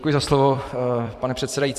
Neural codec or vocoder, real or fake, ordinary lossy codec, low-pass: vocoder, 44.1 kHz, 128 mel bands every 256 samples, BigVGAN v2; fake; Opus, 64 kbps; 14.4 kHz